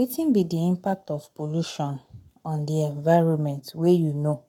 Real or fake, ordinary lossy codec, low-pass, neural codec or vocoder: fake; none; 19.8 kHz; codec, 44.1 kHz, 7.8 kbps, Pupu-Codec